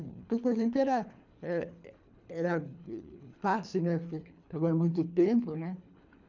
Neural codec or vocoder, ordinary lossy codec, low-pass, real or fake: codec, 24 kHz, 3 kbps, HILCodec; none; 7.2 kHz; fake